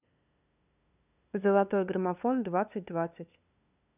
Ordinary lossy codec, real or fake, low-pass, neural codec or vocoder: none; fake; 3.6 kHz; codec, 16 kHz, 2 kbps, FunCodec, trained on LibriTTS, 25 frames a second